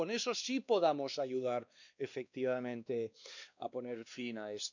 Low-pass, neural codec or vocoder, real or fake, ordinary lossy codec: 7.2 kHz; codec, 16 kHz, 2 kbps, X-Codec, WavLM features, trained on Multilingual LibriSpeech; fake; none